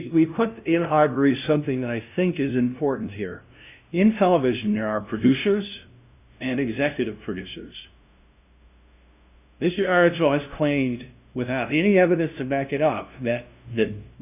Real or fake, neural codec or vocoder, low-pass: fake; codec, 16 kHz, 0.5 kbps, FunCodec, trained on LibriTTS, 25 frames a second; 3.6 kHz